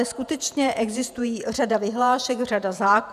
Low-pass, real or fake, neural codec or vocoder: 14.4 kHz; fake; vocoder, 48 kHz, 128 mel bands, Vocos